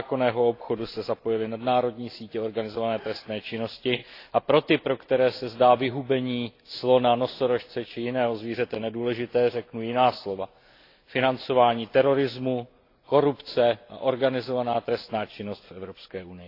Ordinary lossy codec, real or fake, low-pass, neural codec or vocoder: AAC, 32 kbps; real; 5.4 kHz; none